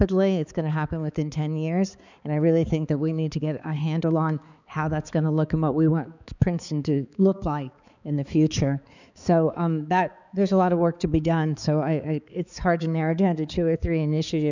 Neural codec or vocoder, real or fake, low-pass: codec, 16 kHz, 4 kbps, X-Codec, HuBERT features, trained on balanced general audio; fake; 7.2 kHz